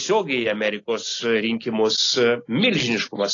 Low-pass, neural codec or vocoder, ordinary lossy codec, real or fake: 7.2 kHz; none; AAC, 32 kbps; real